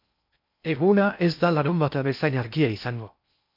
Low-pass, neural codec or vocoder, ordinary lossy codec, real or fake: 5.4 kHz; codec, 16 kHz in and 24 kHz out, 0.6 kbps, FocalCodec, streaming, 2048 codes; MP3, 48 kbps; fake